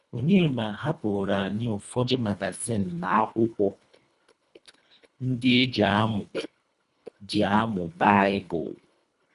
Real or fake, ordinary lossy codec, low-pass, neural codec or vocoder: fake; none; 10.8 kHz; codec, 24 kHz, 1.5 kbps, HILCodec